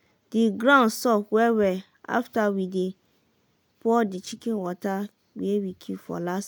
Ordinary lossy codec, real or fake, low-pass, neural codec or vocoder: none; real; none; none